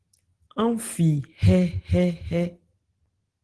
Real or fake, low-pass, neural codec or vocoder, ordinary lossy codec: real; 10.8 kHz; none; Opus, 16 kbps